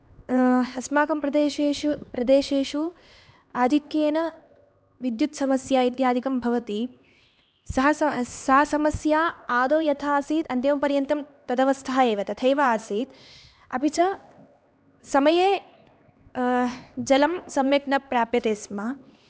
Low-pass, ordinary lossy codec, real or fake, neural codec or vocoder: none; none; fake; codec, 16 kHz, 2 kbps, X-Codec, HuBERT features, trained on LibriSpeech